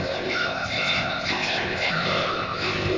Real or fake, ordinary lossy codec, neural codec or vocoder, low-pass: fake; AAC, 32 kbps; codec, 16 kHz, 0.8 kbps, ZipCodec; 7.2 kHz